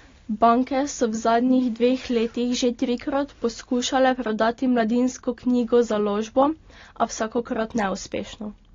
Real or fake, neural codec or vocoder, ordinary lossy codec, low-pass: real; none; AAC, 32 kbps; 7.2 kHz